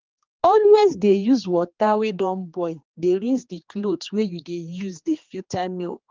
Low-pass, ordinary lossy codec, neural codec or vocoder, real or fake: 7.2 kHz; Opus, 32 kbps; codec, 16 kHz, 2 kbps, X-Codec, HuBERT features, trained on general audio; fake